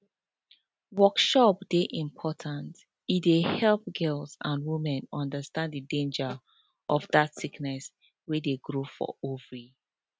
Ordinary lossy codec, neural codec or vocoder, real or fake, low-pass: none; none; real; none